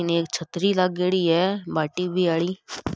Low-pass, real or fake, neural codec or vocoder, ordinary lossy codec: none; real; none; none